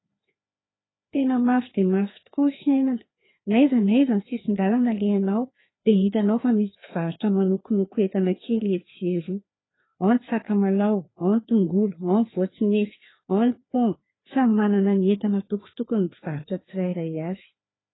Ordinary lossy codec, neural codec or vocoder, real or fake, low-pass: AAC, 16 kbps; codec, 16 kHz, 2 kbps, FreqCodec, larger model; fake; 7.2 kHz